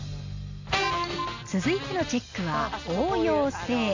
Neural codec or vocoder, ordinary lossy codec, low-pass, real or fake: none; AAC, 48 kbps; 7.2 kHz; real